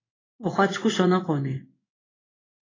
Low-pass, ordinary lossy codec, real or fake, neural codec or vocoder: 7.2 kHz; AAC, 32 kbps; fake; codec, 16 kHz in and 24 kHz out, 1 kbps, XY-Tokenizer